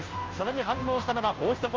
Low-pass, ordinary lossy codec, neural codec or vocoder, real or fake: 7.2 kHz; Opus, 24 kbps; codec, 16 kHz, 0.5 kbps, FunCodec, trained on Chinese and English, 25 frames a second; fake